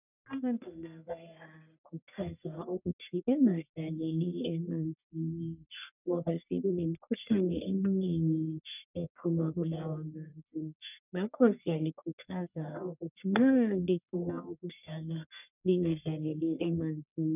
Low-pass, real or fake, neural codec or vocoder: 3.6 kHz; fake; codec, 44.1 kHz, 1.7 kbps, Pupu-Codec